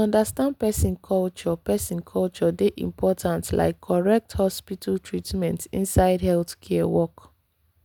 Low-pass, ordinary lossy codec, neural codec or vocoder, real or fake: 19.8 kHz; none; none; real